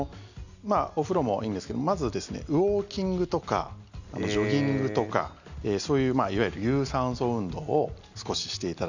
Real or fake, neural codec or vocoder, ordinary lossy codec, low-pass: real; none; none; 7.2 kHz